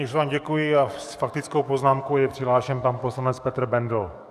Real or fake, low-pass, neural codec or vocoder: fake; 14.4 kHz; codec, 44.1 kHz, 7.8 kbps, DAC